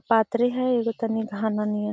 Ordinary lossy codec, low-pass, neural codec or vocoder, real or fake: none; none; none; real